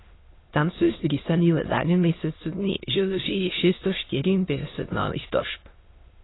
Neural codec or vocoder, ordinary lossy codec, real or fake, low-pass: autoencoder, 22.05 kHz, a latent of 192 numbers a frame, VITS, trained on many speakers; AAC, 16 kbps; fake; 7.2 kHz